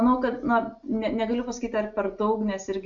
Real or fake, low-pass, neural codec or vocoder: real; 7.2 kHz; none